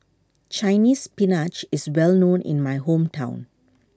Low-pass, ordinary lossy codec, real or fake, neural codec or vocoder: none; none; real; none